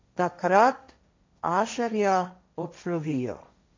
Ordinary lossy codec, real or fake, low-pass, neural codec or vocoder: MP3, 48 kbps; fake; 7.2 kHz; codec, 16 kHz, 1.1 kbps, Voila-Tokenizer